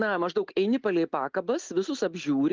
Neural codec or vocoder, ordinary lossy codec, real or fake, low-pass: none; Opus, 32 kbps; real; 7.2 kHz